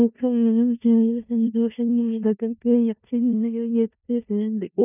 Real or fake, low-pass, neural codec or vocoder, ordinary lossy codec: fake; 3.6 kHz; codec, 16 kHz in and 24 kHz out, 0.4 kbps, LongCat-Audio-Codec, four codebook decoder; none